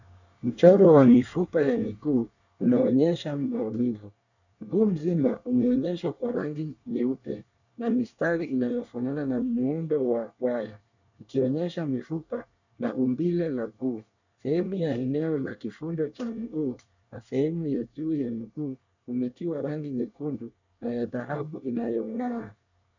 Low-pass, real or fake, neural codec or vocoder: 7.2 kHz; fake; codec, 24 kHz, 1 kbps, SNAC